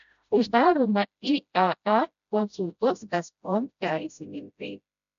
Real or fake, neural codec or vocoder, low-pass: fake; codec, 16 kHz, 0.5 kbps, FreqCodec, smaller model; 7.2 kHz